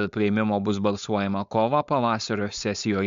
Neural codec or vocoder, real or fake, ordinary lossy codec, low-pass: codec, 16 kHz, 4.8 kbps, FACodec; fake; MP3, 64 kbps; 7.2 kHz